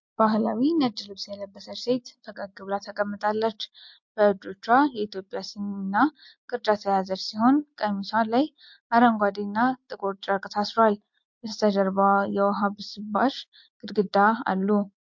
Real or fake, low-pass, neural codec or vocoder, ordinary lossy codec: real; 7.2 kHz; none; MP3, 48 kbps